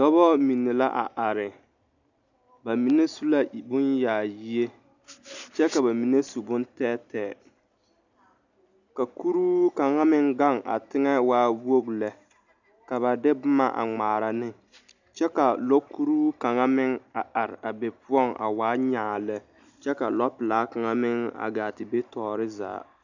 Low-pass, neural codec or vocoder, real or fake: 7.2 kHz; none; real